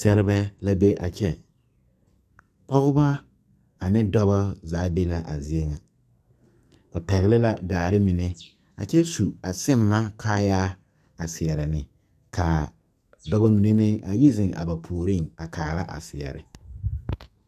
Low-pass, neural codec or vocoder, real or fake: 14.4 kHz; codec, 44.1 kHz, 2.6 kbps, SNAC; fake